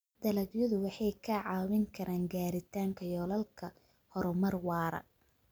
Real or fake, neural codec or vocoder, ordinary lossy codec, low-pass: real; none; none; none